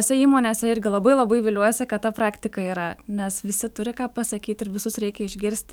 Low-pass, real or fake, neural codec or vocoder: 19.8 kHz; fake; codec, 44.1 kHz, 7.8 kbps, DAC